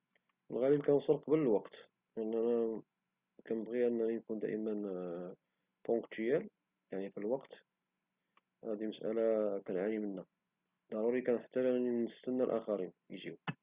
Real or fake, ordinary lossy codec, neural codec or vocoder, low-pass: real; Opus, 64 kbps; none; 3.6 kHz